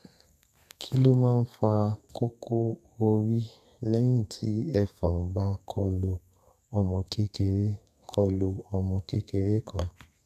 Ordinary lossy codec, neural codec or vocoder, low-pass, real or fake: none; codec, 32 kHz, 1.9 kbps, SNAC; 14.4 kHz; fake